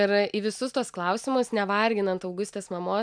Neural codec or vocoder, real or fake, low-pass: none; real; 9.9 kHz